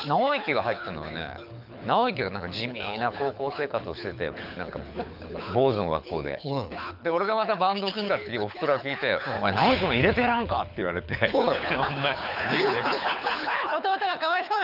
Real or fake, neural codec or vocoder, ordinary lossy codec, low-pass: fake; codec, 24 kHz, 6 kbps, HILCodec; none; 5.4 kHz